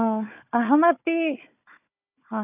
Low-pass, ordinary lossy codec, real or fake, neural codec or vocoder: 3.6 kHz; none; fake; codec, 16 kHz, 4 kbps, FunCodec, trained on Chinese and English, 50 frames a second